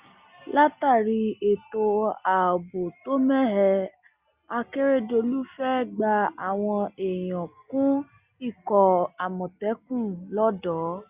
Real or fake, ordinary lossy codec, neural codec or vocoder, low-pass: real; Opus, 64 kbps; none; 3.6 kHz